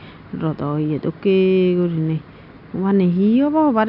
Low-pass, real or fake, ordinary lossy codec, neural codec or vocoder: 5.4 kHz; real; none; none